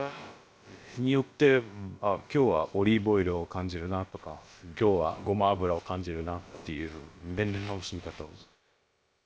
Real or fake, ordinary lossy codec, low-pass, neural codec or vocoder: fake; none; none; codec, 16 kHz, about 1 kbps, DyCAST, with the encoder's durations